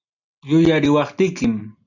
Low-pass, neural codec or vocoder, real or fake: 7.2 kHz; none; real